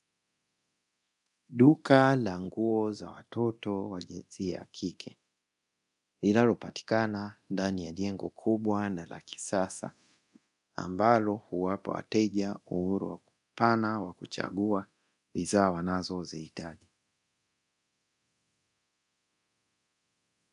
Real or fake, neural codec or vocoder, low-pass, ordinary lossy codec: fake; codec, 24 kHz, 0.9 kbps, DualCodec; 10.8 kHz; AAC, 96 kbps